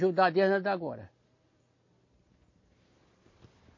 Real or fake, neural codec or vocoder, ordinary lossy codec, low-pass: real; none; MP3, 32 kbps; 7.2 kHz